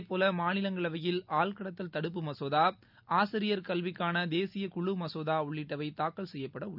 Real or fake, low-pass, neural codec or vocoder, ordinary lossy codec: real; 5.4 kHz; none; none